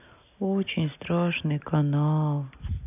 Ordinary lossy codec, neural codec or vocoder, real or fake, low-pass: none; none; real; 3.6 kHz